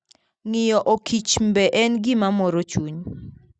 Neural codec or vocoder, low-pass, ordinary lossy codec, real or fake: none; 9.9 kHz; Opus, 64 kbps; real